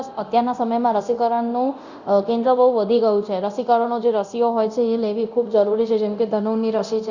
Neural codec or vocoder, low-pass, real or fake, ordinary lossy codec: codec, 24 kHz, 0.9 kbps, DualCodec; 7.2 kHz; fake; Opus, 64 kbps